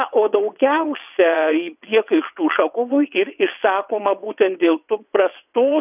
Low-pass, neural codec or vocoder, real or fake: 3.6 kHz; vocoder, 22.05 kHz, 80 mel bands, WaveNeXt; fake